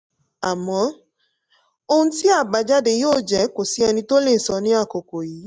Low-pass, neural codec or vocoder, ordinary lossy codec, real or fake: none; none; none; real